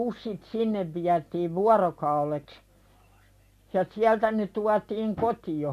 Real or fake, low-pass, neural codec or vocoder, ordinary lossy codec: real; 14.4 kHz; none; MP3, 64 kbps